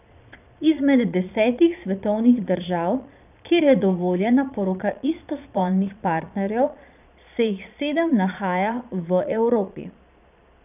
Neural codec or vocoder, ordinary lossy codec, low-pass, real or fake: vocoder, 22.05 kHz, 80 mel bands, Vocos; none; 3.6 kHz; fake